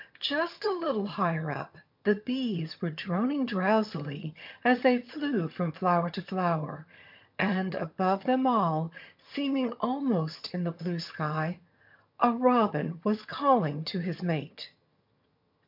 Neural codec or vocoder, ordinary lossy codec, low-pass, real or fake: vocoder, 22.05 kHz, 80 mel bands, HiFi-GAN; MP3, 48 kbps; 5.4 kHz; fake